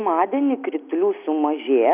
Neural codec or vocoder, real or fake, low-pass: none; real; 3.6 kHz